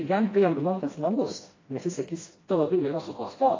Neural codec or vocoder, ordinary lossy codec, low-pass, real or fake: codec, 16 kHz, 1 kbps, FreqCodec, smaller model; AAC, 32 kbps; 7.2 kHz; fake